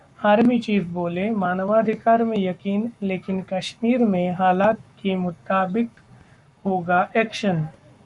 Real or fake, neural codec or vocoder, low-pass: fake; codec, 44.1 kHz, 7.8 kbps, Pupu-Codec; 10.8 kHz